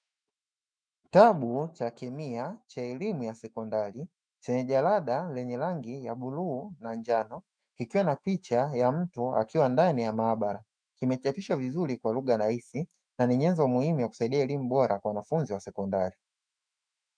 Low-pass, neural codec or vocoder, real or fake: 9.9 kHz; autoencoder, 48 kHz, 128 numbers a frame, DAC-VAE, trained on Japanese speech; fake